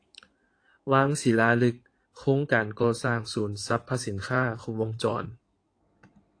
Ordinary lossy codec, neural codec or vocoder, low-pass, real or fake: AAC, 48 kbps; codec, 16 kHz in and 24 kHz out, 2.2 kbps, FireRedTTS-2 codec; 9.9 kHz; fake